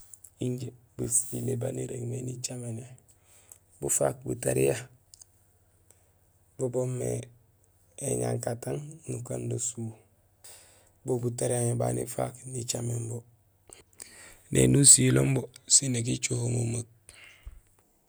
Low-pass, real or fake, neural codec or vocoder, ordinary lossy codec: none; real; none; none